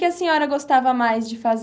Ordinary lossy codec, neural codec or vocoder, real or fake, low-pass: none; none; real; none